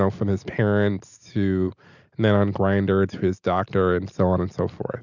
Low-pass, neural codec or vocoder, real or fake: 7.2 kHz; none; real